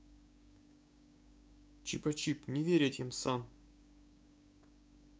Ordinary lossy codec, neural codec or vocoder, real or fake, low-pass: none; codec, 16 kHz, 6 kbps, DAC; fake; none